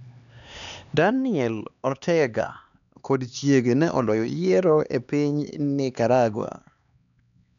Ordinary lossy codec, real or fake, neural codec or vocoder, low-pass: none; fake; codec, 16 kHz, 2 kbps, X-Codec, HuBERT features, trained on LibriSpeech; 7.2 kHz